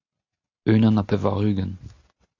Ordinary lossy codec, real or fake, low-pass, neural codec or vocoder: AAC, 48 kbps; real; 7.2 kHz; none